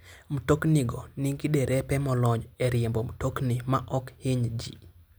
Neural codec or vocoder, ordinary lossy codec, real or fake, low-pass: none; none; real; none